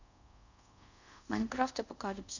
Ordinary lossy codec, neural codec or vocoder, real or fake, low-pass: none; codec, 24 kHz, 0.5 kbps, DualCodec; fake; 7.2 kHz